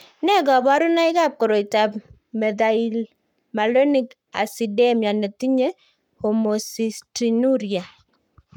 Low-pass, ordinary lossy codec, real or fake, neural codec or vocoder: 19.8 kHz; none; fake; codec, 44.1 kHz, 7.8 kbps, Pupu-Codec